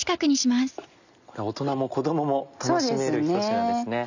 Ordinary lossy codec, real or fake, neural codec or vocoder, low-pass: none; real; none; 7.2 kHz